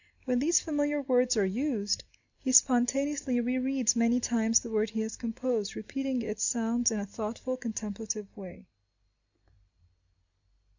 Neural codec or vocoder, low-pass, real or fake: none; 7.2 kHz; real